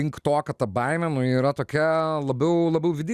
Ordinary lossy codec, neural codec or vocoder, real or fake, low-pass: Opus, 64 kbps; none; real; 14.4 kHz